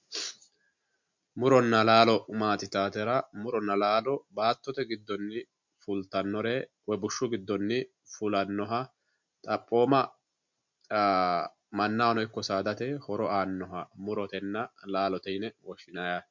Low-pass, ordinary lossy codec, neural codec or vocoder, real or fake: 7.2 kHz; MP3, 64 kbps; none; real